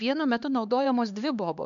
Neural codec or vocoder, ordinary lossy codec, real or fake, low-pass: codec, 16 kHz, 2 kbps, X-Codec, HuBERT features, trained on LibriSpeech; MP3, 96 kbps; fake; 7.2 kHz